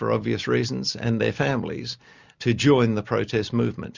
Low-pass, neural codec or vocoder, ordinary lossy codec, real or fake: 7.2 kHz; none; Opus, 64 kbps; real